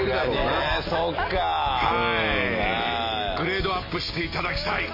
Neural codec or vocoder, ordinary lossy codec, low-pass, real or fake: none; MP3, 24 kbps; 5.4 kHz; real